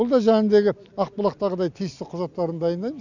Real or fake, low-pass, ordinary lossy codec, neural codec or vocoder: real; 7.2 kHz; none; none